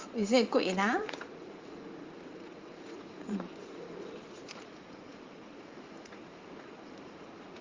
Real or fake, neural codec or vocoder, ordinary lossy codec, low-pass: fake; vocoder, 44.1 kHz, 80 mel bands, Vocos; Opus, 32 kbps; 7.2 kHz